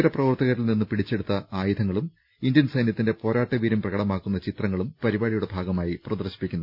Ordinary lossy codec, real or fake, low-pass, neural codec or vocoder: none; real; 5.4 kHz; none